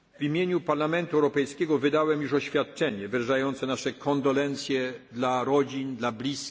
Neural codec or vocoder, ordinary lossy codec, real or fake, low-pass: none; none; real; none